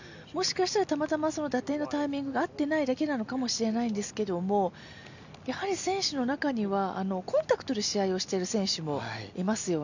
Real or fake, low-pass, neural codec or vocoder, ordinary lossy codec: real; 7.2 kHz; none; none